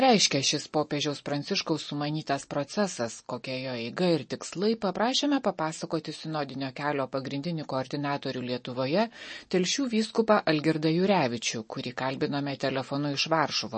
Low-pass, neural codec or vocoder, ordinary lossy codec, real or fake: 10.8 kHz; none; MP3, 32 kbps; real